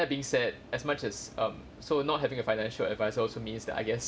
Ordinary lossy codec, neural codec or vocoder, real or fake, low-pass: none; none; real; none